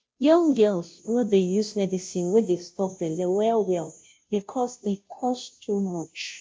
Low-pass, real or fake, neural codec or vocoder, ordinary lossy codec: none; fake; codec, 16 kHz, 0.5 kbps, FunCodec, trained on Chinese and English, 25 frames a second; none